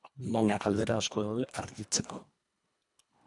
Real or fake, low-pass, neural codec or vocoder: fake; 10.8 kHz; codec, 24 kHz, 1.5 kbps, HILCodec